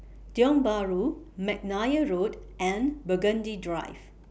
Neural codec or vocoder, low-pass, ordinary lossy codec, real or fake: none; none; none; real